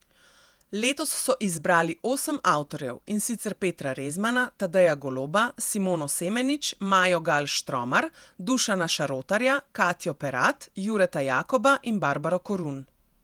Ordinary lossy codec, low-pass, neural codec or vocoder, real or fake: Opus, 32 kbps; 19.8 kHz; vocoder, 48 kHz, 128 mel bands, Vocos; fake